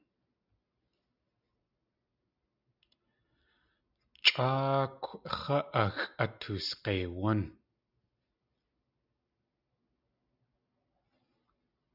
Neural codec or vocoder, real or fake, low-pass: none; real; 5.4 kHz